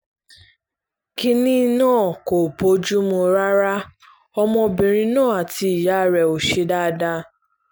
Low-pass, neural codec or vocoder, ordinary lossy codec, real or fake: none; none; none; real